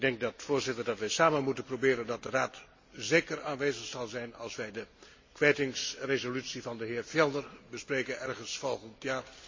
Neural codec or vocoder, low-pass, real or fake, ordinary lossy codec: none; 7.2 kHz; real; MP3, 32 kbps